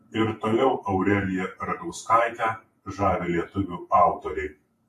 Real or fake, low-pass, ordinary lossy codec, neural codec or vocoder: fake; 14.4 kHz; AAC, 64 kbps; vocoder, 48 kHz, 128 mel bands, Vocos